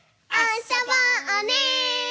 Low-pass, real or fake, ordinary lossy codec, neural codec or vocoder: none; real; none; none